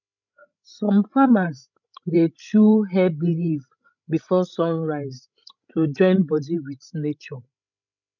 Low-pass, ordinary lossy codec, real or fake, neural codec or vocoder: 7.2 kHz; none; fake; codec, 16 kHz, 16 kbps, FreqCodec, larger model